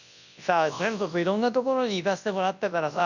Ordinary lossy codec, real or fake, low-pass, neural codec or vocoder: none; fake; 7.2 kHz; codec, 24 kHz, 0.9 kbps, WavTokenizer, large speech release